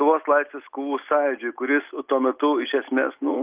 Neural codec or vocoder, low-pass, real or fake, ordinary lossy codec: none; 3.6 kHz; real; Opus, 24 kbps